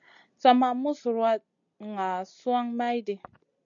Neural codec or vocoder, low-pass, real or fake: none; 7.2 kHz; real